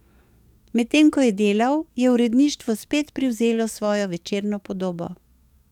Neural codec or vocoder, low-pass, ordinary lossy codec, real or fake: codec, 44.1 kHz, 7.8 kbps, DAC; 19.8 kHz; none; fake